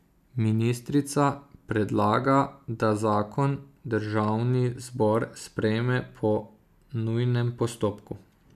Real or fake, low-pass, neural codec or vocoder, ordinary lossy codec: real; 14.4 kHz; none; none